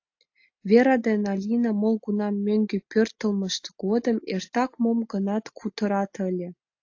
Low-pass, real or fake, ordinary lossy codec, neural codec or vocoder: 7.2 kHz; real; AAC, 48 kbps; none